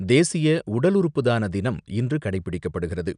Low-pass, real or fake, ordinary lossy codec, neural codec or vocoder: 9.9 kHz; real; none; none